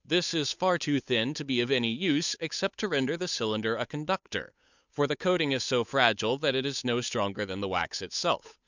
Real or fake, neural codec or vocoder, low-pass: fake; codec, 16 kHz, 8 kbps, FunCodec, trained on Chinese and English, 25 frames a second; 7.2 kHz